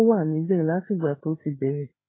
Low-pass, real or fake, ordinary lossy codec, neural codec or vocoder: 7.2 kHz; fake; AAC, 16 kbps; codec, 16 kHz, 4 kbps, FreqCodec, larger model